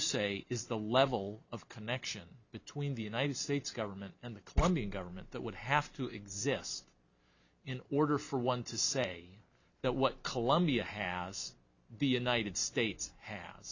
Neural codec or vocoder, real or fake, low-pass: none; real; 7.2 kHz